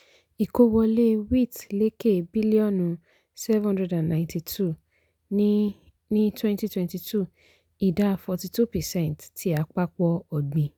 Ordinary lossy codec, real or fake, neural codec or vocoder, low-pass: none; real; none; none